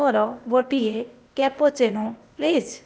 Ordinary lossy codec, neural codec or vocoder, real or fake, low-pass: none; codec, 16 kHz, 0.8 kbps, ZipCodec; fake; none